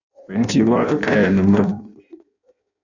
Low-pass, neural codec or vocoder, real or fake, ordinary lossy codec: 7.2 kHz; codec, 16 kHz in and 24 kHz out, 0.6 kbps, FireRedTTS-2 codec; fake; AAC, 48 kbps